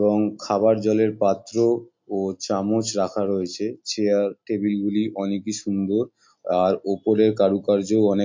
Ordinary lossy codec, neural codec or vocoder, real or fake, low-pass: MP3, 48 kbps; none; real; 7.2 kHz